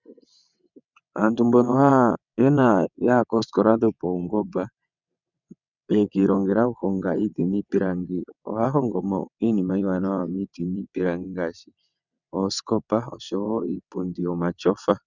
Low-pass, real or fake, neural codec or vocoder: 7.2 kHz; fake; vocoder, 22.05 kHz, 80 mel bands, WaveNeXt